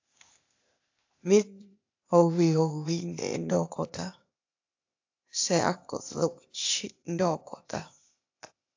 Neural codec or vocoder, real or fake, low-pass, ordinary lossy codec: codec, 16 kHz, 0.8 kbps, ZipCodec; fake; 7.2 kHz; AAC, 48 kbps